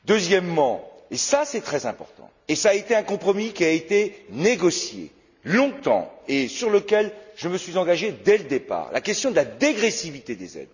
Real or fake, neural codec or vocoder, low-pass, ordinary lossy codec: real; none; 7.2 kHz; none